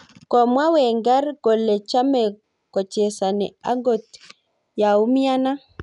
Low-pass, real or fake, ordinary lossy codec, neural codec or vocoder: 10.8 kHz; real; none; none